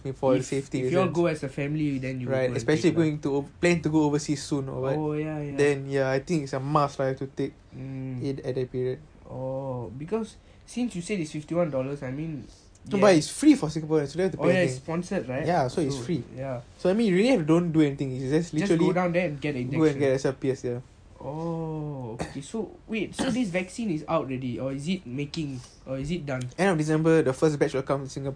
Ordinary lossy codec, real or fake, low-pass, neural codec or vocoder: MP3, 64 kbps; real; 9.9 kHz; none